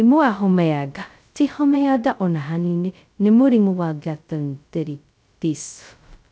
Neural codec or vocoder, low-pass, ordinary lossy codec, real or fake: codec, 16 kHz, 0.2 kbps, FocalCodec; none; none; fake